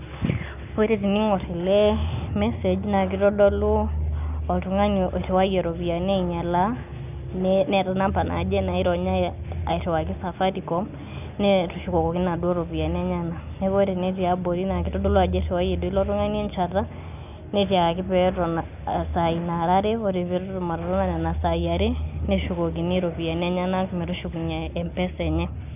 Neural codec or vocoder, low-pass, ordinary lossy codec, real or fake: none; 3.6 kHz; none; real